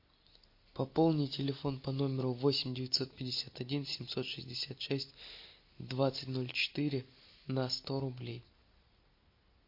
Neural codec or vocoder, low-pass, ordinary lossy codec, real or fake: none; 5.4 kHz; MP3, 32 kbps; real